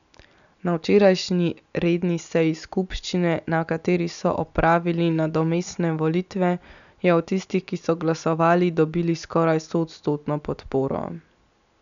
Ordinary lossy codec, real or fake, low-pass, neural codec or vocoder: none; real; 7.2 kHz; none